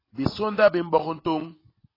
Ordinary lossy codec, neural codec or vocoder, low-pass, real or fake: AAC, 24 kbps; none; 5.4 kHz; real